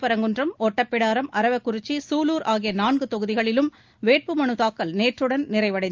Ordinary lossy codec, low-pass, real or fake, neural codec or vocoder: Opus, 24 kbps; 7.2 kHz; real; none